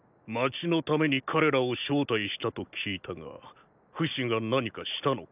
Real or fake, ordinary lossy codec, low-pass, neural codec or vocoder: real; none; 3.6 kHz; none